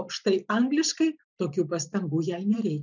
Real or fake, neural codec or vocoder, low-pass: fake; vocoder, 44.1 kHz, 128 mel bands every 256 samples, BigVGAN v2; 7.2 kHz